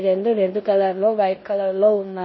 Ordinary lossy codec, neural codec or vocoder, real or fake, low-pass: MP3, 24 kbps; codec, 16 kHz in and 24 kHz out, 0.9 kbps, LongCat-Audio-Codec, four codebook decoder; fake; 7.2 kHz